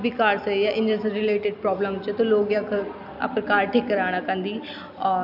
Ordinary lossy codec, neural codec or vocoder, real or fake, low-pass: AAC, 48 kbps; none; real; 5.4 kHz